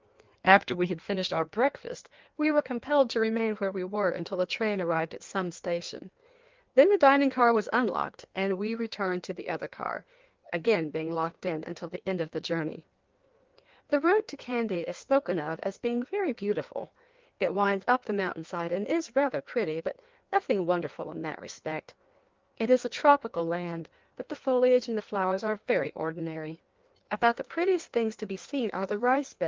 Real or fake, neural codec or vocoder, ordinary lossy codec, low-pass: fake; codec, 16 kHz in and 24 kHz out, 1.1 kbps, FireRedTTS-2 codec; Opus, 32 kbps; 7.2 kHz